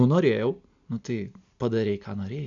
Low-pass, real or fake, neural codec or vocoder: 7.2 kHz; real; none